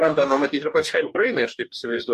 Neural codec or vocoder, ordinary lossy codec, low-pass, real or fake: codec, 44.1 kHz, 2.6 kbps, DAC; MP3, 64 kbps; 14.4 kHz; fake